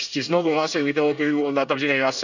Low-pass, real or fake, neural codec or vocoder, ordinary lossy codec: 7.2 kHz; fake; codec, 24 kHz, 1 kbps, SNAC; none